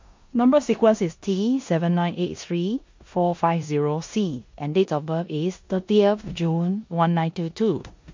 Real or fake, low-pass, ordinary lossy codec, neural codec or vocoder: fake; 7.2 kHz; MP3, 48 kbps; codec, 16 kHz in and 24 kHz out, 0.9 kbps, LongCat-Audio-Codec, four codebook decoder